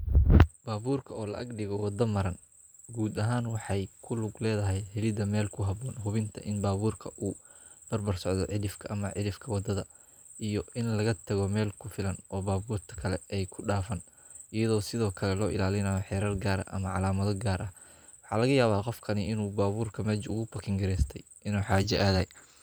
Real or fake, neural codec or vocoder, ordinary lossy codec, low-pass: fake; vocoder, 44.1 kHz, 128 mel bands every 512 samples, BigVGAN v2; none; none